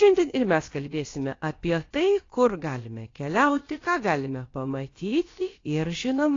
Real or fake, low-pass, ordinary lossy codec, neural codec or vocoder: fake; 7.2 kHz; AAC, 32 kbps; codec, 16 kHz, about 1 kbps, DyCAST, with the encoder's durations